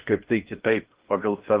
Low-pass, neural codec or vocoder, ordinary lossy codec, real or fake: 3.6 kHz; codec, 16 kHz in and 24 kHz out, 0.6 kbps, FocalCodec, streaming, 4096 codes; Opus, 16 kbps; fake